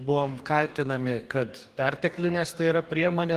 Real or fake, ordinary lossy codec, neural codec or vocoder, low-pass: fake; Opus, 24 kbps; codec, 44.1 kHz, 2.6 kbps, DAC; 14.4 kHz